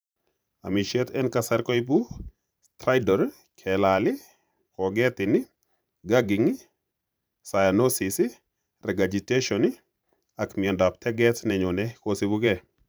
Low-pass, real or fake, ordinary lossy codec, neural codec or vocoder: none; fake; none; vocoder, 44.1 kHz, 128 mel bands every 512 samples, BigVGAN v2